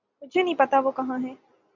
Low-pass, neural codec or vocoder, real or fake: 7.2 kHz; none; real